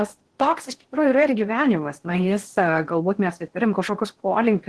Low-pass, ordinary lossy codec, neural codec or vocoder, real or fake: 10.8 kHz; Opus, 16 kbps; codec, 16 kHz in and 24 kHz out, 0.6 kbps, FocalCodec, streaming, 4096 codes; fake